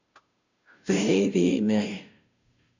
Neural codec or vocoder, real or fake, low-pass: codec, 16 kHz, 0.5 kbps, FunCodec, trained on Chinese and English, 25 frames a second; fake; 7.2 kHz